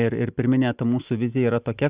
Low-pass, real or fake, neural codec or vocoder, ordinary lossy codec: 3.6 kHz; real; none; Opus, 64 kbps